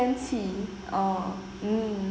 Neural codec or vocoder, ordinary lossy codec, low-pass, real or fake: none; none; none; real